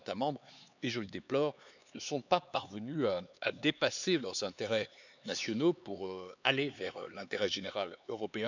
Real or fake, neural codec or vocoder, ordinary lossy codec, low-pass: fake; codec, 16 kHz, 4 kbps, X-Codec, HuBERT features, trained on LibriSpeech; none; 7.2 kHz